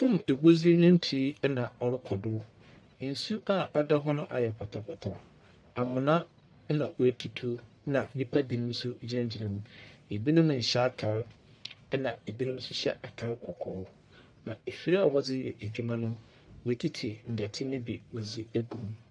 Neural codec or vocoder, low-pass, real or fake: codec, 44.1 kHz, 1.7 kbps, Pupu-Codec; 9.9 kHz; fake